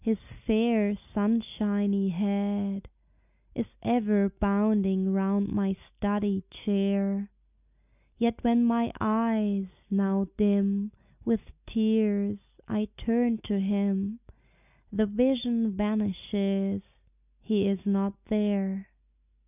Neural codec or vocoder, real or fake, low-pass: none; real; 3.6 kHz